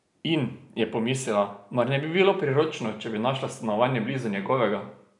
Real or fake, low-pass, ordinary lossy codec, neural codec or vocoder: real; 10.8 kHz; none; none